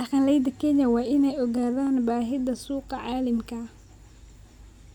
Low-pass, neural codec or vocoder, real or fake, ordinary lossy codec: 19.8 kHz; none; real; none